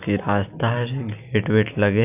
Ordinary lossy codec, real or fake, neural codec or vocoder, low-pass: none; real; none; 3.6 kHz